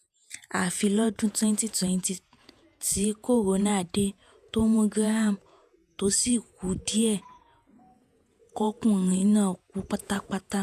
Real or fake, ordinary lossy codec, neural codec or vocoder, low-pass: fake; none; vocoder, 48 kHz, 128 mel bands, Vocos; 14.4 kHz